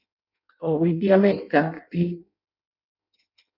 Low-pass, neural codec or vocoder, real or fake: 5.4 kHz; codec, 16 kHz in and 24 kHz out, 0.6 kbps, FireRedTTS-2 codec; fake